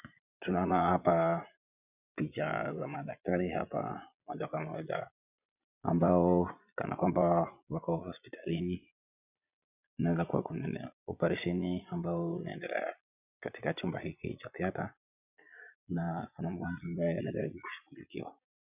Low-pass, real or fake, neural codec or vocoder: 3.6 kHz; fake; vocoder, 22.05 kHz, 80 mel bands, Vocos